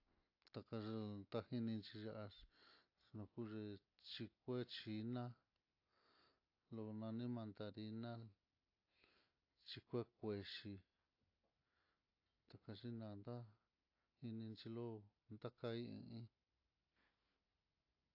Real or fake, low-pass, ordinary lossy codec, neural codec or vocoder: real; 5.4 kHz; AAC, 48 kbps; none